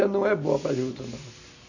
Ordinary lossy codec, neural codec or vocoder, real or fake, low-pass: none; none; real; 7.2 kHz